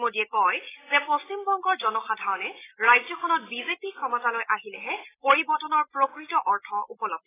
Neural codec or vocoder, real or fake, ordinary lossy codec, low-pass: none; real; AAC, 16 kbps; 3.6 kHz